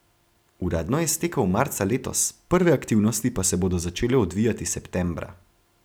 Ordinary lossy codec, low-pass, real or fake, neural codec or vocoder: none; none; real; none